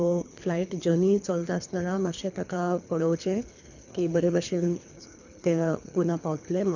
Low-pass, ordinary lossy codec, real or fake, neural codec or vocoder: 7.2 kHz; none; fake; codec, 24 kHz, 3 kbps, HILCodec